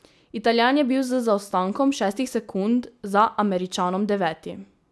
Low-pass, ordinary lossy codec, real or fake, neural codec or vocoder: none; none; real; none